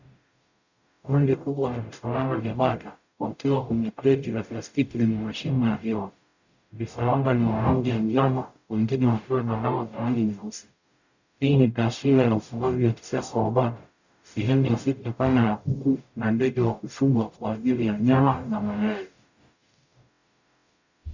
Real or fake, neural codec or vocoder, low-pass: fake; codec, 44.1 kHz, 0.9 kbps, DAC; 7.2 kHz